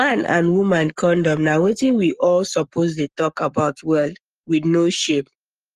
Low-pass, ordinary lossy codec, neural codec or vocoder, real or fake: 14.4 kHz; Opus, 32 kbps; codec, 44.1 kHz, 7.8 kbps, Pupu-Codec; fake